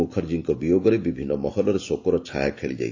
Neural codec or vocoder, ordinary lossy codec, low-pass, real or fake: none; AAC, 48 kbps; 7.2 kHz; real